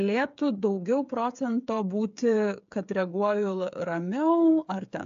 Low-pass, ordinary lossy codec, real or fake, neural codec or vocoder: 7.2 kHz; AAC, 64 kbps; fake; codec, 16 kHz, 8 kbps, FreqCodec, smaller model